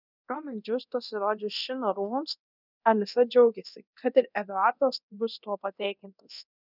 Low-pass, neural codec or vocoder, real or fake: 5.4 kHz; codec, 24 kHz, 0.9 kbps, DualCodec; fake